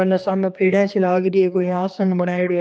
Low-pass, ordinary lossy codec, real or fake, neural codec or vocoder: none; none; fake; codec, 16 kHz, 2 kbps, X-Codec, HuBERT features, trained on general audio